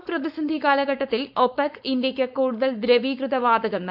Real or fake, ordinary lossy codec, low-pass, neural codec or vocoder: fake; AAC, 48 kbps; 5.4 kHz; codec, 16 kHz, 4.8 kbps, FACodec